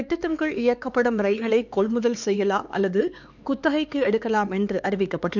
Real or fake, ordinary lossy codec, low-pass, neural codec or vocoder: fake; none; 7.2 kHz; codec, 16 kHz, 4 kbps, X-Codec, HuBERT features, trained on LibriSpeech